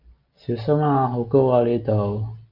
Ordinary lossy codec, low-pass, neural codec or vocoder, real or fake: AAC, 48 kbps; 5.4 kHz; none; real